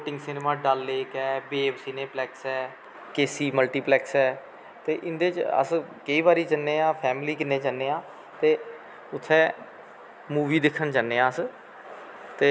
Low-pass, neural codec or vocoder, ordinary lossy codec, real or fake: none; none; none; real